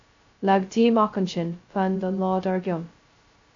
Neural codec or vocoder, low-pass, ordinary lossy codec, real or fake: codec, 16 kHz, 0.2 kbps, FocalCodec; 7.2 kHz; AAC, 48 kbps; fake